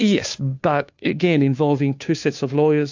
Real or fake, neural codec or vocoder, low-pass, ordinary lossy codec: fake; codec, 16 kHz, 2 kbps, FunCodec, trained on Chinese and English, 25 frames a second; 7.2 kHz; AAC, 48 kbps